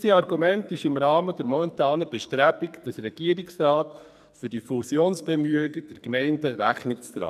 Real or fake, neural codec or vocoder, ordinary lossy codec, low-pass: fake; codec, 44.1 kHz, 2.6 kbps, SNAC; none; 14.4 kHz